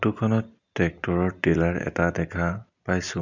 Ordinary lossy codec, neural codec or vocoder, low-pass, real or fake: none; none; 7.2 kHz; real